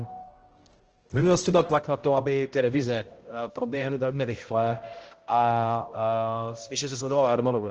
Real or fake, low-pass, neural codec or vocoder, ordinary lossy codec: fake; 7.2 kHz; codec, 16 kHz, 0.5 kbps, X-Codec, HuBERT features, trained on balanced general audio; Opus, 16 kbps